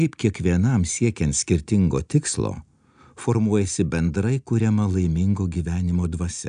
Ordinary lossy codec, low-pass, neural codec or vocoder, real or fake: AAC, 96 kbps; 9.9 kHz; none; real